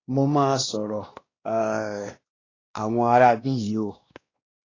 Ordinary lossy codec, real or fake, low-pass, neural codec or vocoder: AAC, 32 kbps; fake; 7.2 kHz; codec, 16 kHz, 1 kbps, X-Codec, WavLM features, trained on Multilingual LibriSpeech